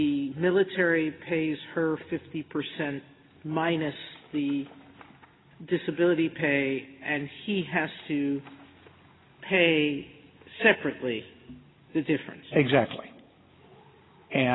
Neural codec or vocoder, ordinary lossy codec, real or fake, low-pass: none; AAC, 16 kbps; real; 7.2 kHz